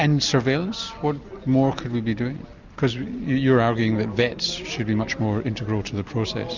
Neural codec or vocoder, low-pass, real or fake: none; 7.2 kHz; real